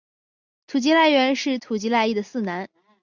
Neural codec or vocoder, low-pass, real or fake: none; 7.2 kHz; real